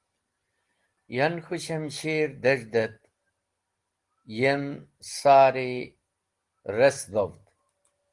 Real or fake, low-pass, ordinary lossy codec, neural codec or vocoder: real; 10.8 kHz; Opus, 24 kbps; none